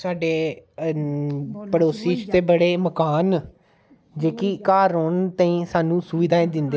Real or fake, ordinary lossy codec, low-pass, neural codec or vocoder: real; none; none; none